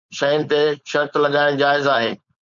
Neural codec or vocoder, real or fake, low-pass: codec, 16 kHz, 4.8 kbps, FACodec; fake; 7.2 kHz